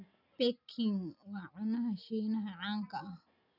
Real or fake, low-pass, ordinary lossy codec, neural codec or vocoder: real; 5.4 kHz; none; none